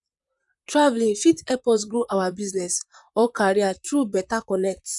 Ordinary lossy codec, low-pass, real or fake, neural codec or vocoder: none; 10.8 kHz; fake; vocoder, 44.1 kHz, 128 mel bands, Pupu-Vocoder